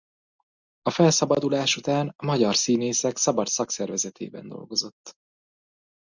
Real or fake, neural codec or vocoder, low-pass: real; none; 7.2 kHz